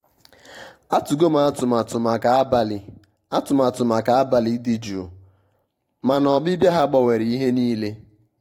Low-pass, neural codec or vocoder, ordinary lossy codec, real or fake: 19.8 kHz; vocoder, 44.1 kHz, 128 mel bands every 512 samples, BigVGAN v2; AAC, 48 kbps; fake